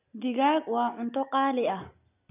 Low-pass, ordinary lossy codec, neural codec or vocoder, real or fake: 3.6 kHz; none; none; real